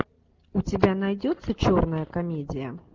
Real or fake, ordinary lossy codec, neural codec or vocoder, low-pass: real; Opus, 32 kbps; none; 7.2 kHz